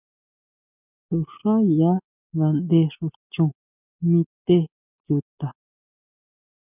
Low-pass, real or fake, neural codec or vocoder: 3.6 kHz; real; none